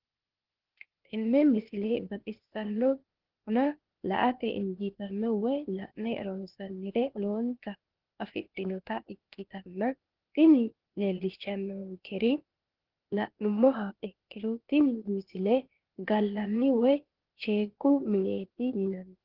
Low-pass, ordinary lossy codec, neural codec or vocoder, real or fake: 5.4 kHz; Opus, 16 kbps; codec, 16 kHz, 0.8 kbps, ZipCodec; fake